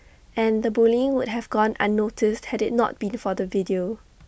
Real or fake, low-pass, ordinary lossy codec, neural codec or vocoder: real; none; none; none